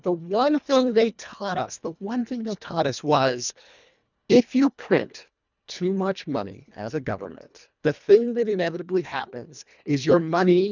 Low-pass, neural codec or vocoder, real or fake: 7.2 kHz; codec, 24 kHz, 1.5 kbps, HILCodec; fake